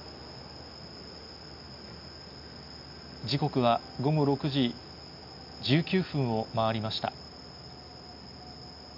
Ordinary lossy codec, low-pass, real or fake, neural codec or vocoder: none; 5.4 kHz; real; none